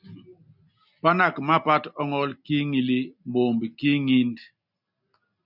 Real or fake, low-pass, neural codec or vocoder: real; 5.4 kHz; none